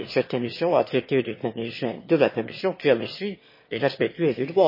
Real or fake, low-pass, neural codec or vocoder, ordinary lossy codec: fake; 5.4 kHz; autoencoder, 22.05 kHz, a latent of 192 numbers a frame, VITS, trained on one speaker; MP3, 24 kbps